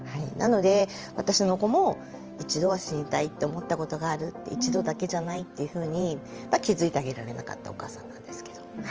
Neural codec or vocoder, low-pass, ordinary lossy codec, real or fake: vocoder, 44.1 kHz, 128 mel bands every 512 samples, BigVGAN v2; 7.2 kHz; Opus, 24 kbps; fake